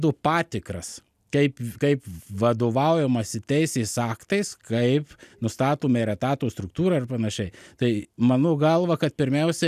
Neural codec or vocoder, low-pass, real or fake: none; 14.4 kHz; real